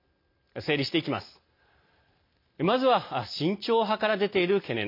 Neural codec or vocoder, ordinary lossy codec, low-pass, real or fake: none; MP3, 32 kbps; 5.4 kHz; real